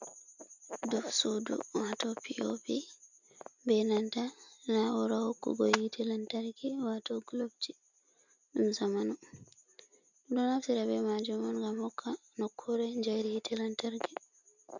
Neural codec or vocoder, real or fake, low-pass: none; real; 7.2 kHz